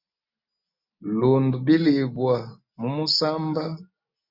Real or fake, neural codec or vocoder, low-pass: real; none; 5.4 kHz